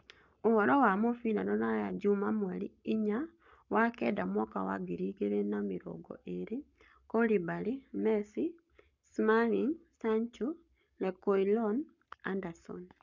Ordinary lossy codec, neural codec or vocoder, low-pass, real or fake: none; codec, 44.1 kHz, 7.8 kbps, Pupu-Codec; 7.2 kHz; fake